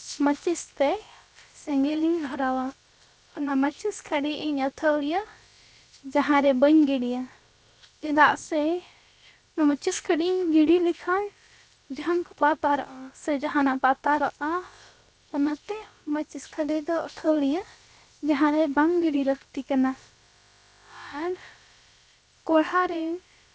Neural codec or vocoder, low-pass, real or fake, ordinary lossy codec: codec, 16 kHz, about 1 kbps, DyCAST, with the encoder's durations; none; fake; none